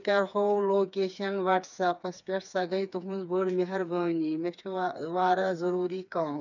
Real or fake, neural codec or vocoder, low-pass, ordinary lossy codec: fake; codec, 16 kHz, 4 kbps, FreqCodec, smaller model; 7.2 kHz; none